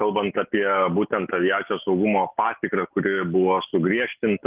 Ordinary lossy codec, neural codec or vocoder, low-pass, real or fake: Opus, 24 kbps; none; 3.6 kHz; real